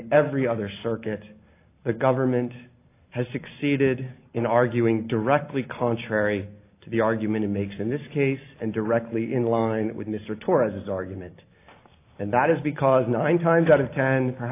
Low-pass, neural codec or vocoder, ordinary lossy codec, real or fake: 3.6 kHz; none; AAC, 24 kbps; real